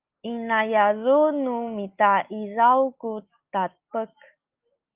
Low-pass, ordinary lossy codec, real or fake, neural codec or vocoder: 3.6 kHz; Opus, 24 kbps; real; none